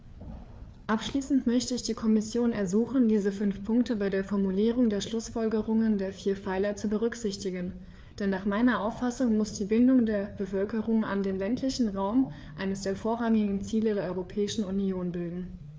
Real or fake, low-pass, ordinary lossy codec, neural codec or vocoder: fake; none; none; codec, 16 kHz, 4 kbps, FreqCodec, larger model